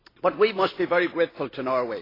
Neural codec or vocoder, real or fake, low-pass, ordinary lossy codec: none; real; 5.4 kHz; AAC, 24 kbps